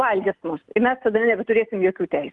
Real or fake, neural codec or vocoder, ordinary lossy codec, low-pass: real; none; Opus, 24 kbps; 10.8 kHz